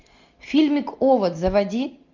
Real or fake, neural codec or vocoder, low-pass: real; none; 7.2 kHz